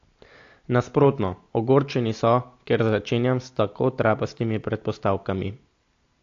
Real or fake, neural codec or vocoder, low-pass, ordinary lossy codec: real; none; 7.2 kHz; AAC, 48 kbps